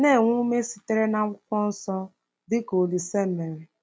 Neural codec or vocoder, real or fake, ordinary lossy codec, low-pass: none; real; none; none